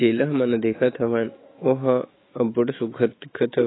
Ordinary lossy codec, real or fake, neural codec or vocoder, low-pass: AAC, 16 kbps; fake; autoencoder, 48 kHz, 128 numbers a frame, DAC-VAE, trained on Japanese speech; 7.2 kHz